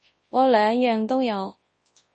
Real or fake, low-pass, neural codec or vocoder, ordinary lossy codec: fake; 10.8 kHz; codec, 24 kHz, 0.9 kbps, WavTokenizer, large speech release; MP3, 32 kbps